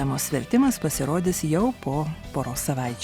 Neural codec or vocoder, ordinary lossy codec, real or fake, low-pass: none; Opus, 64 kbps; real; 19.8 kHz